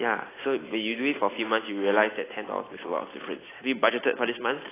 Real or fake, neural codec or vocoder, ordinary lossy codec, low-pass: real; none; AAC, 16 kbps; 3.6 kHz